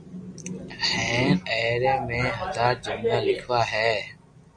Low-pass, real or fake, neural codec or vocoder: 9.9 kHz; real; none